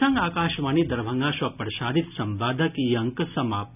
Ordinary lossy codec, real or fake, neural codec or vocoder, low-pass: none; real; none; 3.6 kHz